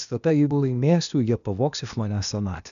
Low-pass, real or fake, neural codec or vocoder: 7.2 kHz; fake; codec, 16 kHz, 0.8 kbps, ZipCodec